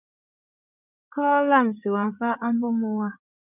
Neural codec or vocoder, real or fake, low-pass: codec, 16 kHz in and 24 kHz out, 2.2 kbps, FireRedTTS-2 codec; fake; 3.6 kHz